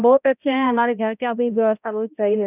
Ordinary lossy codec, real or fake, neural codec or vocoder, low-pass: none; fake; codec, 16 kHz, 0.5 kbps, X-Codec, HuBERT features, trained on balanced general audio; 3.6 kHz